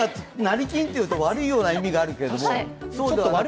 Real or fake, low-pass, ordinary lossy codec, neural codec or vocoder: real; none; none; none